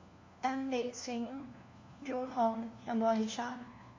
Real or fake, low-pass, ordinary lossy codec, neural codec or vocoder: fake; 7.2 kHz; MP3, 48 kbps; codec, 16 kHz, 1 kbps, FunCodec, trained on LibriTTS, 50 frames a second